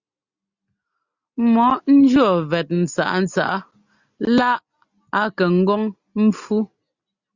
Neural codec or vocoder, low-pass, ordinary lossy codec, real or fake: none; 7.2 kHz; Opus, 64 kbps; real